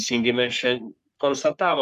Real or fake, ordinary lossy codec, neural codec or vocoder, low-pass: fake; MP3, 96 kbps; codec, 44.1 kHz, 3.4 kbps, Pupu-Codec; 14.4 kHz